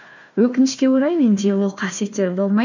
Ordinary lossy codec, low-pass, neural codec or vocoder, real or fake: none; 7.2 kHz; codec, 16 kHz, 1 kbps, FunCodec, trained on Chinese and English, 50 frames a second; fake